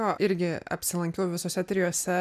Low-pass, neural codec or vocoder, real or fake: 14.4 kHz; none; real